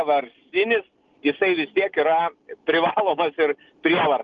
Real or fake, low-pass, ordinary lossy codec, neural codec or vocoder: real; 7.2 kHz; Opus, 32 kbps; none